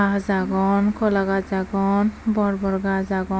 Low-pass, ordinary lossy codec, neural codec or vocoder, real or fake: none; none; none; real